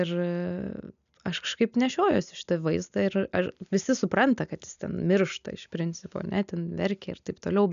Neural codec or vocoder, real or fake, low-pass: none; real; 7.2 kHz